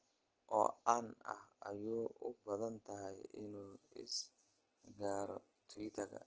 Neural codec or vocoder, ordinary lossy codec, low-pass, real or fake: none; Opus, 16 kbps; 7.2 kHz; real